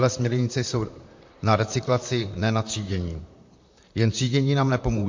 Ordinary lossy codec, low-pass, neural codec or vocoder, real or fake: MP3, 48 kbps; 7.2 kHz; vocoder, 44.1 kHz, 128 mel bands, Pupu-Vocoder; fake